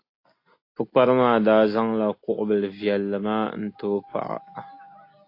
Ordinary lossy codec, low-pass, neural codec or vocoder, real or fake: AAC, 32 kbps; 5.4 kHz; none; real